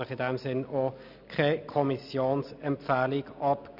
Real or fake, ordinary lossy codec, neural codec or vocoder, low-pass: real; none; none; 5.4 kHz